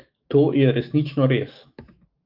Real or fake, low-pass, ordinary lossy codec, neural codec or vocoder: fake; 5.4 kHz; Opus, 24 kbps; autoencoder, 48 kHz, 128 numbers a frame, DAC-VAE, trained on Japanese speech